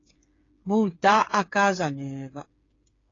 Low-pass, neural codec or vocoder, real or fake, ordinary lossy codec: 7.2 kHz; codec, 16 kHz, 8 kbps, FreqCodec, smaller model; fake; AAC, 32 kbps